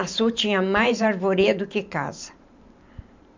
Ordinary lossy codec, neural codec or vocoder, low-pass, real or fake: MP3, 64 kbps; vocoder, 44.1 kHz, 128 mel bands every 512 samples, BigVGAN v2; 7.2 kHz; fake